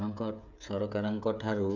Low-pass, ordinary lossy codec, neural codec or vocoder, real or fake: 7.2 kHz; none; codec, 16 kHz, 8 kbps, FreqCodec, smaller model; fake